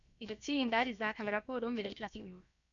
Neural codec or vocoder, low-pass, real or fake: codec, 16 kHz, about 1 kbps, DyCAST, with the encoder's durations; 7.2 kHz; fake